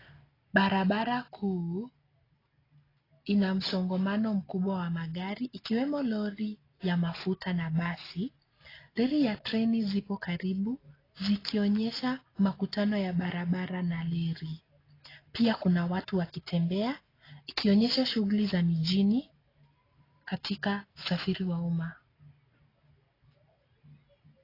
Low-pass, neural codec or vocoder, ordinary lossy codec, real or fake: 5.4 kHz; none; AAC, 24 kbps; real